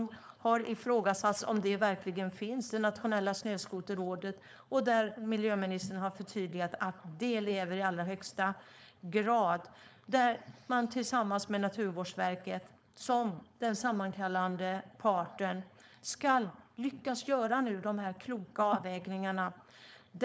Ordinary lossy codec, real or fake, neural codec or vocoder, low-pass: none; fake; codec, 16 kHz, 4.8 kbps, FACodec; none